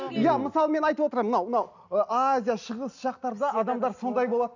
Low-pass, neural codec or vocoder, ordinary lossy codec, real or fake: 7.2 kHz; none; none; real